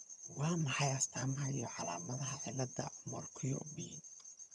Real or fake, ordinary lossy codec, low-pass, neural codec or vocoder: fake; none; none; vocoder, 22.05 kHz, 80 mel bands, HiFi-GAN